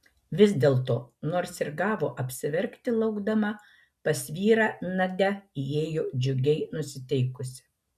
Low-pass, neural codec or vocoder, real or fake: 14.4 kHz; none; real